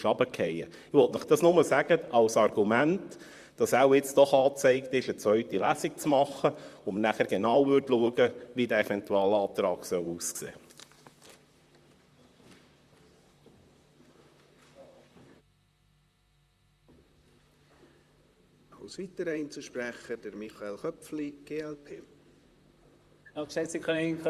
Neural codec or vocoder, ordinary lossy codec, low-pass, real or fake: vocoder, 44.1 kHz, 128 mel bands, Pupu-Vocoder; Opus, 64 kbps; 14.4 kHz; fake